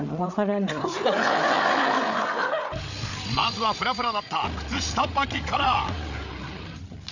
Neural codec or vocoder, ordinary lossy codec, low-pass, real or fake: codec, 16 kHz, 8 kbps, FreqCodec, larger model; none; 7.2 kHz; fake